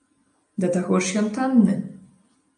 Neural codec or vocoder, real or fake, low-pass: none; real; 9.9 kHz